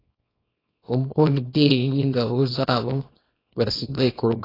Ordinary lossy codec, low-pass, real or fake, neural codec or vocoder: AAC, 24 kbps; 5.4 kHz; fake; codec, 24 kHz, 0.9 kbps, WavTokenizer, small release